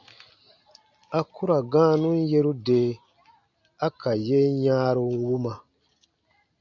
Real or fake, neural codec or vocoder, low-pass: real; none; 7.2 kHz